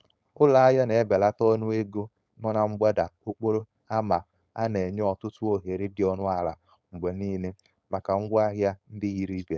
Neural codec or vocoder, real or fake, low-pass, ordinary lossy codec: codec, 16 kHz, 4.8 kbps, FACodec; fake; none; none